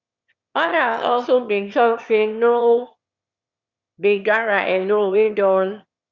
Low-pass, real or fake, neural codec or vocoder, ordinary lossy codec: 7.2 kHz; fake; autoencoder, 22.05 kHz, a latent of 192 numbers a frame, VITS, trained on one speaker; Opus, 64 kbps